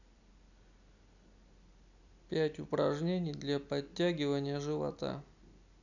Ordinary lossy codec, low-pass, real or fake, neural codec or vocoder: none; 7.2 kHz; real; none